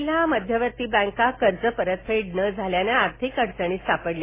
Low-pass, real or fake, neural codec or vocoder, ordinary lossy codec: 3.6 kHz; real; none; MP3, 16 kbps